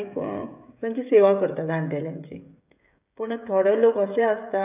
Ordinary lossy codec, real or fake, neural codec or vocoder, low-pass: none; fake; codec, 16 kHz, 16 kbps, FreqCodec, smaller model; 3.6 kHz